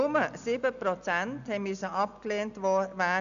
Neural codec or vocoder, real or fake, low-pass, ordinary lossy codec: none; real; 7.2 kHz; none